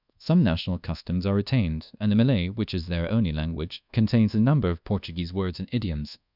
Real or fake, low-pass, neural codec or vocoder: fake; 5.4 kHz; codec, 24 kHz, 1.2 kbps, DualCodec